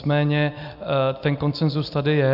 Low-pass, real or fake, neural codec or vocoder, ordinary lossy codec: 5.4 kHz; real; none; AAC, 48 kbps